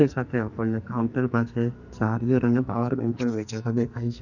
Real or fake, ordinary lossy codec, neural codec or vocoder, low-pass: fake; none; codec, 44.1 kHz, 2.6 kbps, SNAC; 7.2 kHz